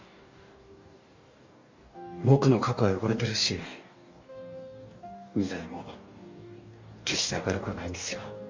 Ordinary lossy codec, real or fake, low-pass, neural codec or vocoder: MP3, 48 kbps; fake; 7.2 kHz; codec, 44.1 kHz, 2.6 kbps, DAC